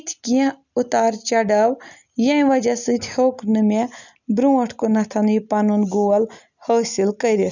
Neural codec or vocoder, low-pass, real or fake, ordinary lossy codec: none; 7.2 kHz; real; none